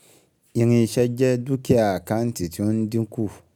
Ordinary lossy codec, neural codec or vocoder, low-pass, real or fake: none; none; none; real